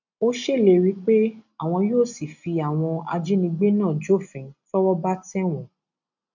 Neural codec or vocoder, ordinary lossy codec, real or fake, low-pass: none; none; real; 7.2 kHz